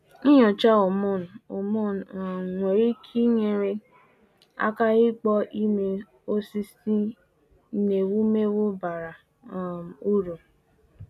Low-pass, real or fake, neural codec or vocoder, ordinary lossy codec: 14.4 kHz; real; none; MP3, 96 kbps